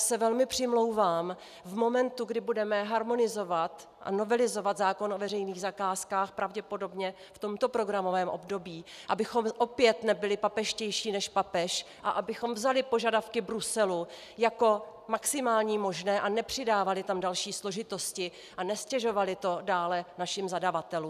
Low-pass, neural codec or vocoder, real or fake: 14.4 kHz; none; real